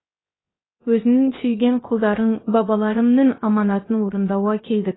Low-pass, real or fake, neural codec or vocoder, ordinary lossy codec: 7.2 kHz; fake; codec, 16 kHz, 0.7 kbps, FocalCodec; AAC, 16 kbps